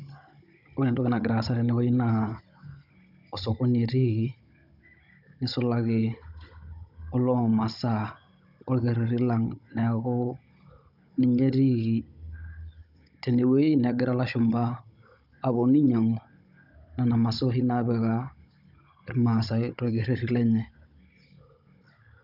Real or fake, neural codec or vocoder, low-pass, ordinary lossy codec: fake; codec, 16 kHz, 16 kbps, FunCodec, trained on Chinese and English, 50 frames a second; 5.4 kHz; none